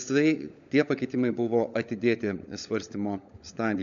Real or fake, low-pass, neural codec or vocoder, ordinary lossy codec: fake; 7.2 kHz; codec, 16 kHz, 16 kbps, FunCodec, trained on LibriTTS, 50 frames a second; MP3, 64 kbps